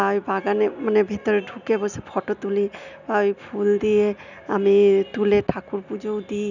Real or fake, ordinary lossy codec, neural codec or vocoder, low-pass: real; none; none; 7.2 kHz